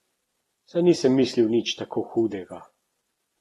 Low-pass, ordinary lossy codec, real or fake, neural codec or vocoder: 19.8 kHz; AAC, 32 kbps; real; none